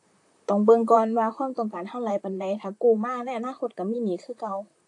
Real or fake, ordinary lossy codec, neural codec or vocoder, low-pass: fake; AAC, 48 kbps; vocoder, 44.1 kHz, 128 mel bands, Pupu-Vocoder; 10.8 kHz